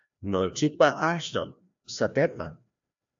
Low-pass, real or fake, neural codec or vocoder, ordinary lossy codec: 7.2 kHz; fake; codec, 16 kHz, 1 kbps, FreqCodec, larger model; AAC, 64 kbps